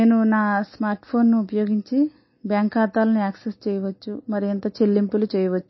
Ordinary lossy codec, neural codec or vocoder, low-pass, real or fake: MP3, 24 kbps; none; 7.2 kHz; real